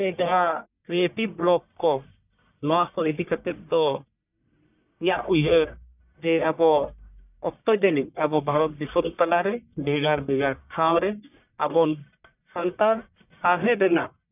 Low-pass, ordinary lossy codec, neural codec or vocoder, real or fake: 3.6 kHz; none; codec, 44.1 kHz, 1.7 kbps, Pupu-Codec; fake